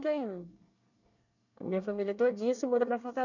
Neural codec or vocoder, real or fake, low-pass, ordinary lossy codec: codec, 24 kHz, 1 kbps, SNAC; fake; 7.2 kHz; none